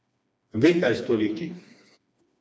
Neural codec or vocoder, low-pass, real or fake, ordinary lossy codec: codec, 16 kHz, 2 kbps, FreqCodec, smaller model; none; fake; none